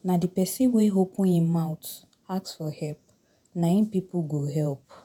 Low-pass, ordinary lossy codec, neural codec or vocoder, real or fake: none; none; vocoder, 48 kHz, 128 mel bands, Vocos; fake